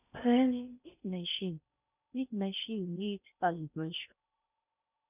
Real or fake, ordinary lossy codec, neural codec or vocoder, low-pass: fake; none; codec, 16 kHz in and 24 kHz out, 0.6 kbps, FocalCodec, streaming, 4096 codes; 3.6 kHz